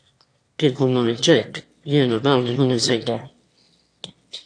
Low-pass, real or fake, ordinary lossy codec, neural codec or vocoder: 9.9 kHz; fake; AAC, 48 kbps; autoencoder, 22.05 kHz, a latent of 192 numbers a frame, VITS, trained on one speaker